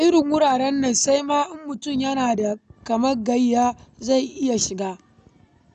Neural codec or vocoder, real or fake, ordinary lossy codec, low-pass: none; real; none; 9.9 kHz